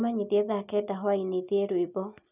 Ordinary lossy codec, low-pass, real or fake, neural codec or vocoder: none; 3.6 kHz; real; none